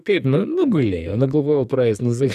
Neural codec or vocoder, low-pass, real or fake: codec, 44.1 kHz, 2.6 kbps, SNAC; 14.4 kHz; fake